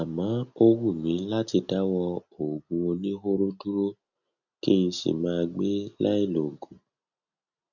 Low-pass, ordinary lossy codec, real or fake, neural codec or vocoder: 7.2 kHz; none; real; none